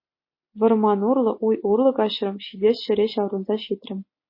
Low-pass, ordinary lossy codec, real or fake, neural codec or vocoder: 5.4 kHz; MP3, 24 kbps; real; none